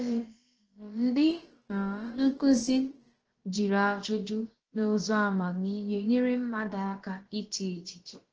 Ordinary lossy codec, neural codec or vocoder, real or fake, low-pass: Opus, 16 kbps; codec, 16 kHz, about 1 kbps, DyCAST, with the encoder's durations; fake; 7.2 kHz